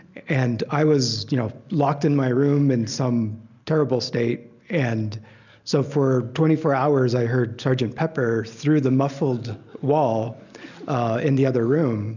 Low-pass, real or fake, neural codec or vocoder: 7.2 kHz; real; none